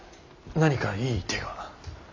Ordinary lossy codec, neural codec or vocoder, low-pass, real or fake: MP3, 64 kbps; none; 7.2 kHz; real